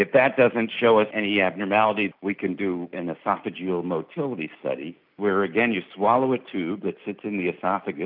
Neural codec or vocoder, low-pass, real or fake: none; 5.4 kHz; real